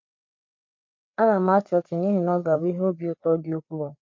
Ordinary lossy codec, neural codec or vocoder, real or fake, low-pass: MP3, 48 kbps; codec, 16 kHz, 4 kbps, FreqCodec, larger model; fake; 7.2 kHz